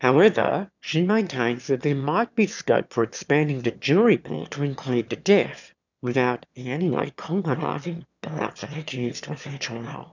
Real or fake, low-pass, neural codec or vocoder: fake; 7.2 kHz; autoencoder, 22.05 kHz, a latent of 192 numbers a frame, VITS, trained on one speaker